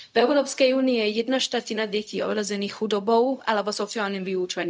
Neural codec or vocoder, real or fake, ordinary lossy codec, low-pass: codec, 16 kHz, 0.4 kbps, LongCat-Audio-Codec; fake; none; none